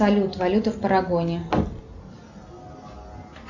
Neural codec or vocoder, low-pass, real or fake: none; 7.2 kHz; real